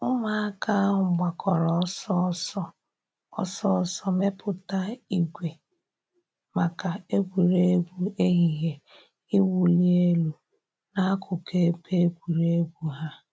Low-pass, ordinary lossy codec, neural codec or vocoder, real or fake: none; none; none; real